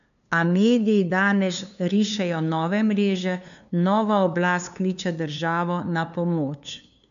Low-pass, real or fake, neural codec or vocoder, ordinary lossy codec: 7.2 kHz; fake; codec, 16 kHz, 2 kbps, FunCodec, trained on LibriTTS, 25 frames a second; none